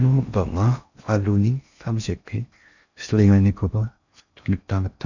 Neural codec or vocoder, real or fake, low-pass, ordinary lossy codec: codec, 16 kHz in and 24 kHz out, 0.6 kbps, FocalCodec, streaming, 4096 codes; fake; 7.2 kHz; none